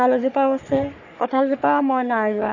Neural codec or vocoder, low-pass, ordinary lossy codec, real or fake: codec, 44.1 kHz, 3.4 kbps, Pupu-Codec; 7.2 kHz; none; fake